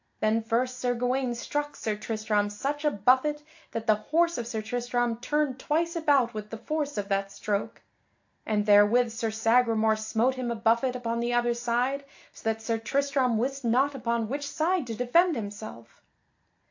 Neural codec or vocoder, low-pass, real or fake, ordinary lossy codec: none; 7.2 kHz; real; AAC, 48 kbps